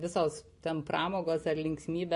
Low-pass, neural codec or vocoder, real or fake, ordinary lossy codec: 14.4 kHz; none; real; MP3, 48 kbps